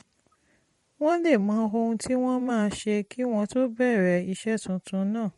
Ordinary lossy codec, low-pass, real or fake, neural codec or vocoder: MP3, 48 kbps; 19.8 kHz; fake; vocoder, 44.1 kHz, 128 mel bands every 512 samples, BigVGAN v2